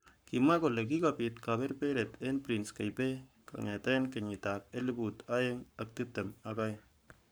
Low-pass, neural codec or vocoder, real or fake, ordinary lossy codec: none; codec, 44.1 kHz, 7.8 kbps, Pupu-Codec; fake; none